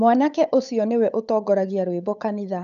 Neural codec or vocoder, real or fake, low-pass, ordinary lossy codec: codec, 16 kHz, 8 kbps, FunCodec, trained on Chinese and English, 25 frames a second; fake; 7.2 kHz; none